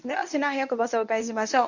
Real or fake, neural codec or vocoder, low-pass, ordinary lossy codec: fake; codec, 24 kHz, 0.9 kbps, WavTokenizer, medium speech release version 1; 7.2 kHz; none